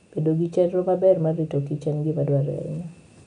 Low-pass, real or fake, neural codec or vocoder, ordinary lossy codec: 9.9 kHz; real; none; none